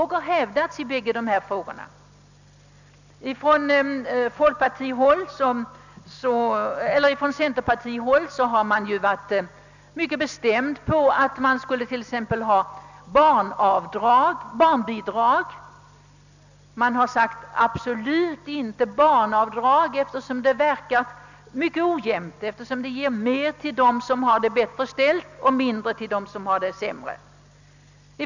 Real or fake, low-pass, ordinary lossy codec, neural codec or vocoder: real; 7.2 kHz; none; none